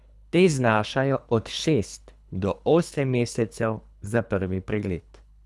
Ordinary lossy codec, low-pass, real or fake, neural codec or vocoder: none; none; fake; codec, 24 kHz, 3 kbps, HILCodec